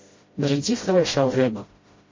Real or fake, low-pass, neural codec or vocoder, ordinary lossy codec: fake; 7.2 kHz; codec, 16 kHz, 0.5 kbps, FreqCodec, smaller model; MP3, 32 kbps